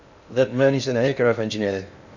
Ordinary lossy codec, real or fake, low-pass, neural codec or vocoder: none; fake; 7.2 kHz; codec, 16 kHz in and 24 kHz out, 0.6 kbps, FocalCodec, streaming, 2048 codes